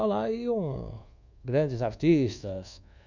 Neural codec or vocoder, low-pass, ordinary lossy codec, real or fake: codec, 24 kHz, 1.2 kbps, DualCodec; 7.2 kHz; none; fake